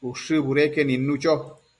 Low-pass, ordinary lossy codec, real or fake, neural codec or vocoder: 10.8 kHz; Opus, 64 kbps; real; none